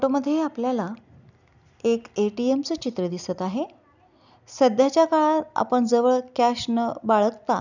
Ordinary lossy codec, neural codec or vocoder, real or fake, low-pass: none; none; real; 7.2 kHz